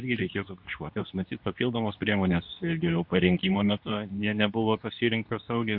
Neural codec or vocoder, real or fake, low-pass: codec, 24 kHz, 0.9 kbps, WavTokenizer, medium speech release version 2; fake; 5.4 kHz